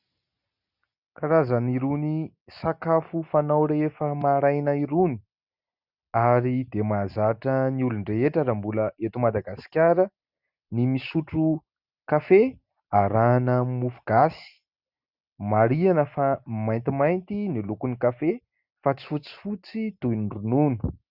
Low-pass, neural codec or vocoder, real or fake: 5.4 kHz; none; real